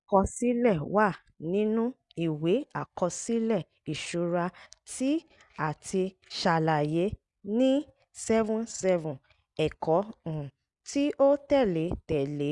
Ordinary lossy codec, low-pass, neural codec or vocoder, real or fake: Opus, 64 kbps; 10.8 kHz; none; real